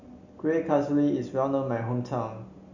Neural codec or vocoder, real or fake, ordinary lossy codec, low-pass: none; real; none; 7.2 kHz